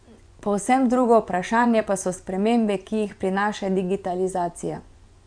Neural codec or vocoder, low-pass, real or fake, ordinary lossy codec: vocoder, 24 kHz, 100 mel bands, Vocos; 9.9 kHz; fake; none